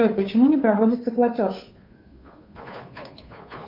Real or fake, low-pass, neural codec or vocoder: fake; 5.4 kHz; codec, 16 kHz, 2 kbps, FunCodec, trained on Chinese and English, 25 frames a second